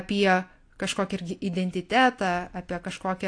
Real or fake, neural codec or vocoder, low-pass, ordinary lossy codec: real; none; 9.9 kHz; AAC, 48 kbps